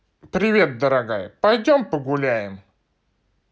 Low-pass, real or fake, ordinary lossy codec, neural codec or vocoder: none; real; none; none